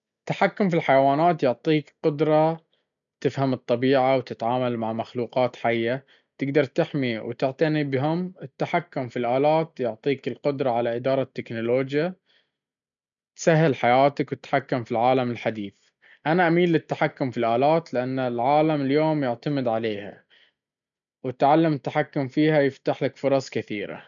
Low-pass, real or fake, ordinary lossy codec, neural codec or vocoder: 7.2 kHz; real; none; none